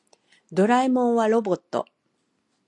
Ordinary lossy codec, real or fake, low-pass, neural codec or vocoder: AAC, 48 kbps; real; 10.8 kHz; none